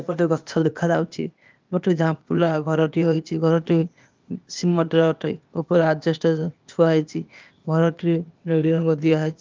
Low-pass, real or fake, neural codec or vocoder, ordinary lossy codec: 7.2 kHz; fake; codec, 16 kHz, 0.8 kbps, ZipCodec; Opus, 24 kbps